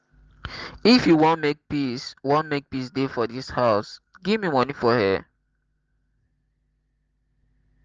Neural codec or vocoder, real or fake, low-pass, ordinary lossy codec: none; real; 7.2 kHz; Opus, 16 kbps